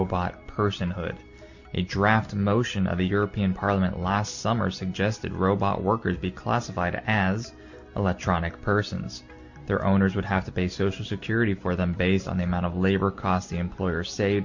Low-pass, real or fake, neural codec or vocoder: 7.2 kHz; real; none